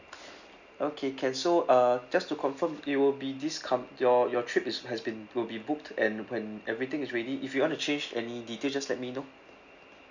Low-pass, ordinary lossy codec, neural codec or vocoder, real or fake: 7.2 kHz; none; none; real